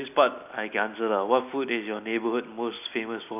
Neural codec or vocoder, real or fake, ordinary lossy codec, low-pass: none; real; none; 3.6 kHz